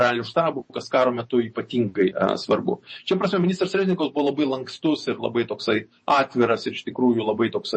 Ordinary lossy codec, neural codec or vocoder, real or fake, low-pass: MP3, 32 kbps; vocoder, 48 kHz, 128 mel bands, Vocos; fake; 9.9 kHz